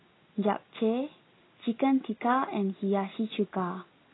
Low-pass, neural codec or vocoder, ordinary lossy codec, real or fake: 7.2 kHz; none; AAC, 16 kbps; real